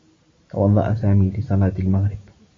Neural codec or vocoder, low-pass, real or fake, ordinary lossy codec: none; 7.2 kHz; real; MP3, 32 kbps